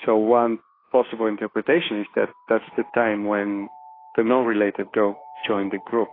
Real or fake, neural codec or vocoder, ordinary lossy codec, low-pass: fake; autoencoder, 48 kHz, 32 numbers a frame, DAC-VAE, trained on Japanese speech; AAC, 24 kbps; 5.4 kHz